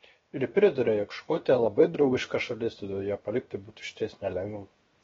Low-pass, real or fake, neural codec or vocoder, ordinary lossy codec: 7.2 kHz; fake; codec, 16 kHz, 0.7 kbps, FocalCodec; AAC, 24 kbps